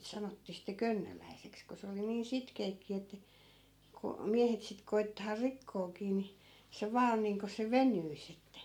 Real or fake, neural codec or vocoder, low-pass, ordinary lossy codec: real; none; 19.8 kHz; MP3, 96 kbps